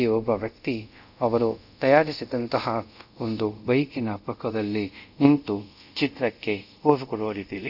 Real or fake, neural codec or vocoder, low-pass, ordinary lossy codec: fake; codec, 24 kHz, 0.5 kbps, DualCodec; 5.4 kHz; none